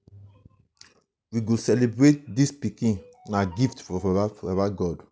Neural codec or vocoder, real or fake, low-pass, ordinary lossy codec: none; real; none; none